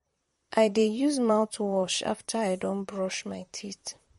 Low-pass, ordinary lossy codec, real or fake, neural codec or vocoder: 19.8 kHz; MP3, 48 kbps; fake; vocoder, 44.1 kHz, 128 mel bands, Pupu-Vocoder